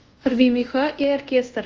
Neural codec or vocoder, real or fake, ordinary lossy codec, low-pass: codec, 24 kHz, 0.5 kbps, DualCodec; fake; Opus, 24 kbps; 7.2 kHz